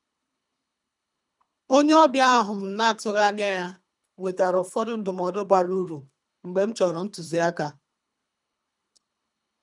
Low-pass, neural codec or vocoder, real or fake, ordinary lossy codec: 10.8 kHz; codec, 24 kHz, 3 kbps, HILCodec; fake; none